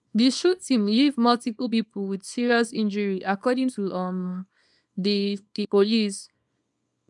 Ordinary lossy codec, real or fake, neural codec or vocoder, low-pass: none; fake; codec, 24 kHz, 0.9 kbps, WavTokenizer, small release; 10.8 kHz